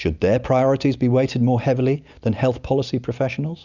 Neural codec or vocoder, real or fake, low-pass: none; real; 7.2 kHz